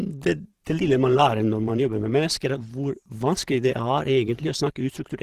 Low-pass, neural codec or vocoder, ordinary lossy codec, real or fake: 14.4 kHz; vocoder, 44.1 kHz, 128 mel bands every 512 samples, BigVGAN v2; Opus, 16 kbps; fake